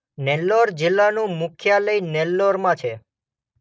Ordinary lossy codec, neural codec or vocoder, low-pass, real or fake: none; none; none; real